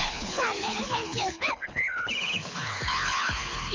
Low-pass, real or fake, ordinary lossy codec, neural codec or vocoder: 7.2 kHz; fake; MP3, 64 kbps; codec, 24 kHz, 6 kbps, HILCodec